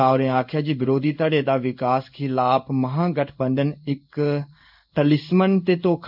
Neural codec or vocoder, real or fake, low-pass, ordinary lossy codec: codec, 16 kHz in and 24 kHz out, 1 kbps, XY-Tokenizer; fake; 5.4 kHz; none